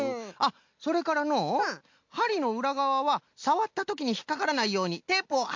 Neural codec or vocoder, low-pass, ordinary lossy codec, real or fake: none; 7.2 kHz; MP3, 48 kbps; real